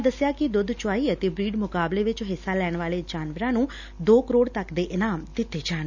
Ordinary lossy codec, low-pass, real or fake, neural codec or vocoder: none; 7.2 kHz; real; none